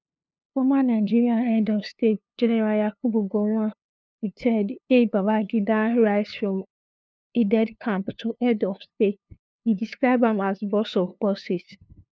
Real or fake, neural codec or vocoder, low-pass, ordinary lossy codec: fake; codec, 16 kHz, 2 kbps, FunCodec, trained on LibriTTS, 25 frames a second; none; none